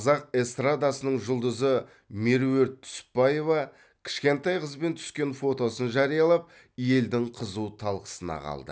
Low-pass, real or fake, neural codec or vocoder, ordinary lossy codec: none; real; none; none